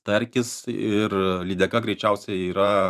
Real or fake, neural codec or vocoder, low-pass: fake; vocoder, 44.1 kHz, 128 mel bands every 512 samples, BigVGAN v2; 14.4 kHz